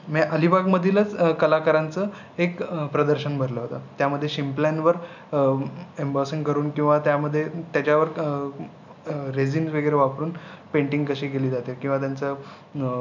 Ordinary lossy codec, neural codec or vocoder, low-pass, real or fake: none; none; 7.2 kHz; real